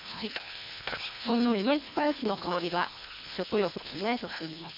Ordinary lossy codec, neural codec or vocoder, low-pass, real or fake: MP3, 48 kbps; codec, 24 kHz, 1.5 kbps, HILCodec; 5.4 kHz; fake